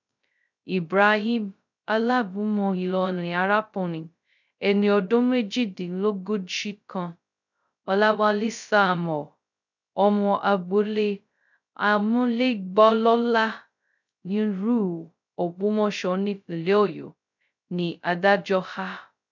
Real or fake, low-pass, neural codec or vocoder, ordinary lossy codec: fake; 7.2 kHz; codec, 16 kHz, 0.2 kbps, FocalCodec; none